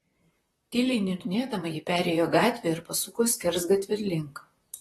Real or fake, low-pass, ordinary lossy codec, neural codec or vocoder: fake; 19.8 kHz; AAC, 32 kbps; vocoder, 44.1 kHz, 128 mel bands, Pupu-Vocoder